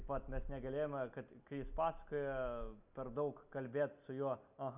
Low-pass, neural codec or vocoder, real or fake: 3.6 kHz; none; real